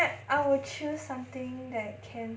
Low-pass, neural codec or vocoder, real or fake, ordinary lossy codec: none; none; real; none